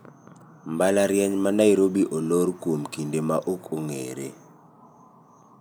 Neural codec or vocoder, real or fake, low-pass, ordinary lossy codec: none; real; none; none